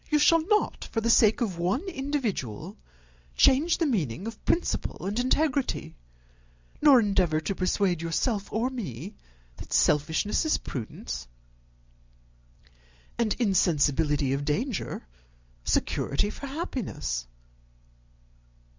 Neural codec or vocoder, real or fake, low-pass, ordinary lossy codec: none; real; 7.2 kHz; MP3, 64 kbps